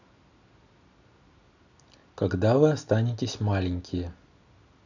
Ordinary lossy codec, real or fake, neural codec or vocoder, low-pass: none; real; none; 7.2 kHz